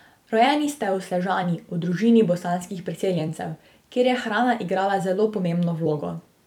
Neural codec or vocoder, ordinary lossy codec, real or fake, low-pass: vocoder, 44.1 kHz, 128 mel bands every 256 samples, BigVGAN v2; none; fake; 19.8 kHz